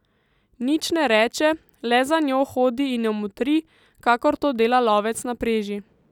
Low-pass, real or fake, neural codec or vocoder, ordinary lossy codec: 19.8 kHz; real; none; none